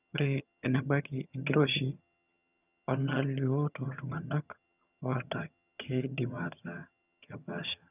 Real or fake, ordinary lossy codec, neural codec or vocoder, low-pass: fake; none; vocoder, 22.05 kHz, 80 mel bands, HiFi-GAN; 3.6 kHz